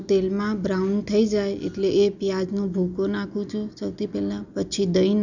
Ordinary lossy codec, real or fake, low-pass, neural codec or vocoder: none; real; 7.2 kHz; none